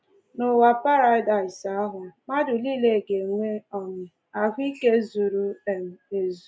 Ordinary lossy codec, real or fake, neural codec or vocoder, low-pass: none; real; none; none